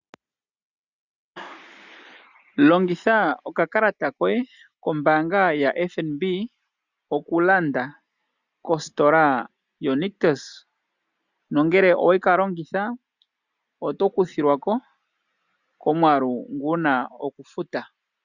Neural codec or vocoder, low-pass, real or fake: none; 7.2 kHz; real